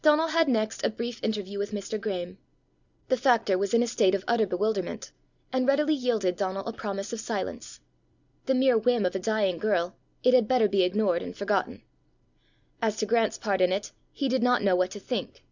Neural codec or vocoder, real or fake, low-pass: none; real; 7.2 kHz